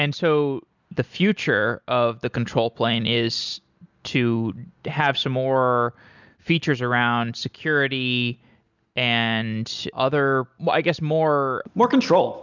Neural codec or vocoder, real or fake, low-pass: none; real; 7.2 kHz